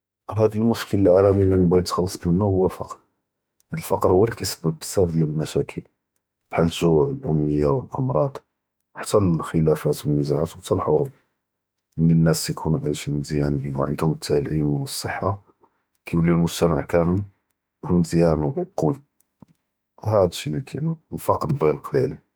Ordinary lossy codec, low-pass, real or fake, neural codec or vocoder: none; none; fake; autoencoder, 48 kHz, 32 numbers a frame, DAC-VAE, trained on Japanese speech